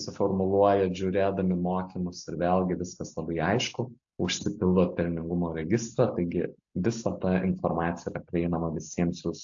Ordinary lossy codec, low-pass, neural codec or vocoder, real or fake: AAC, 64 kbps; 7.2 kHz; none; real